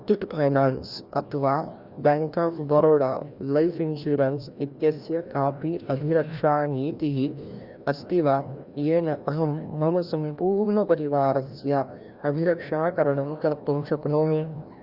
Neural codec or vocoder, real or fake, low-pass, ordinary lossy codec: codec, 16 kHz, 1 kbps, FreqCodec, larger model; fake; 5.4 kHz; Opus, 64 kbps